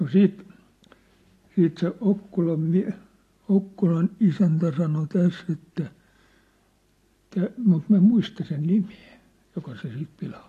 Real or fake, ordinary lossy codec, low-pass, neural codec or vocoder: real; AAC, 48 kbps; 14.4 kHz; none